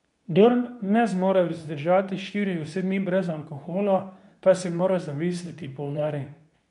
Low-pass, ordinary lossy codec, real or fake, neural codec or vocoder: 10.8 kHz; none; fake; codec, 24 kHz, 0.9 kbps, WavTokenizer, medium speech release version 2